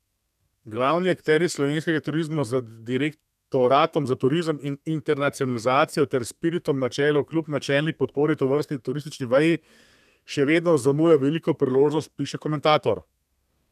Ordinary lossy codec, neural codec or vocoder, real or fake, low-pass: none; codec, 32 kHz, 1.9 kbps, SNAC; fake; 14.4 kHz